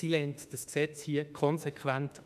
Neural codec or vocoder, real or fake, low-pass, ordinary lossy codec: autoencoder, 48 kHz, 32 numbers a frame, DAC-VAE, trained on Japanese speech; fake; 14.4 kHz; none